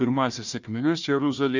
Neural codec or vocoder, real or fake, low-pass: codec, 16 kHz, 1 kbps, FunCodec, trained on Chinese and English, 50 frames a second; fake; 7.2 kHz